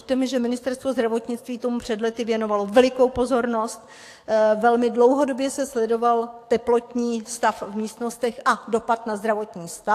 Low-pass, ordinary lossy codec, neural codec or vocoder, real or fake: 14.4 kHz; AAC, 64 kbps; codec, 44.1 kHz, 7.8 kbps, DAC; fake